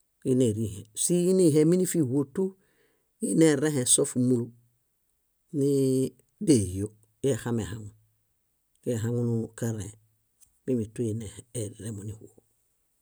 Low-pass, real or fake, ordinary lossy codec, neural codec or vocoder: none; real; none; none